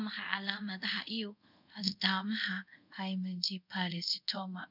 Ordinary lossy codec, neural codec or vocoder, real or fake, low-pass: none; codec, 24 kHz, 0.5 kbps, DualCodec; fake; 5.4 kHz